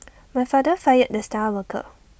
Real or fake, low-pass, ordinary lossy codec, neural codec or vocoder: real; none; none; none